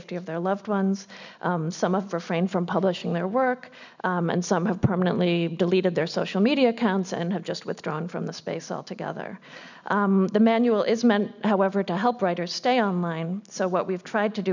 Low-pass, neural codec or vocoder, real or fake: 7.2 kHz; none; real